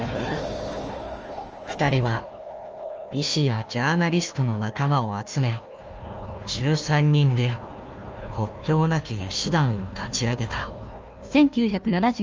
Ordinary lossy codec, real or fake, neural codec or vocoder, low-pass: Opus, 24 kbps; fake; codec, 16 kHz, 1 kbps, FunCodec, trained on Chinese and English, 50 frames a second; 7.2 kHz